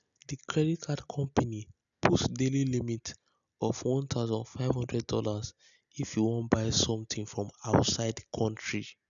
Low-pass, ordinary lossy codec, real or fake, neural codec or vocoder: 7.2 kHz; none; real; none